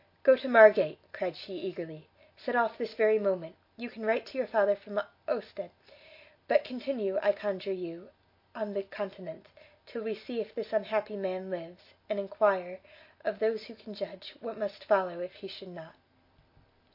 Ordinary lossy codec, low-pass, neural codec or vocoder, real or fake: MP3, 32 kbps; 5.4 kHz; none; real